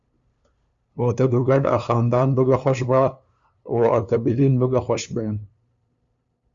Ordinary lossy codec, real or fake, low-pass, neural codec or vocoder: Opus, 64 kbps; fake; 7.2 kHz; codec, 16 kHz, 2 kbps, FunCodec, trained on LibriTTS, 25 frames a second